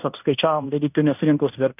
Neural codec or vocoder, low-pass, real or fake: codec, 16 kHz in and 24 kHz out, 0.9 kbps, LongCat-Audio-Codec, fine tuned four codebook decoder; 3.6 kHz; fake